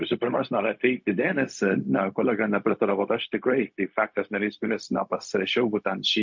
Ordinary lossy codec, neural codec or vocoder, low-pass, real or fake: MP3, 48 kbps; codec, 16 kHz, 0.4 kbps, LongCat-Audio-Codec; 7.2 kHz; fake